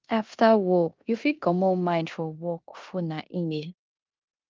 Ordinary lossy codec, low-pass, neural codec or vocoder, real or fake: Opus, 32 kbps; 7.2 kHz; codec, 16 kHz in and 24 kHz out, 0.9 kbps, LongCat-Audio-Codec, four codebook decoder; fake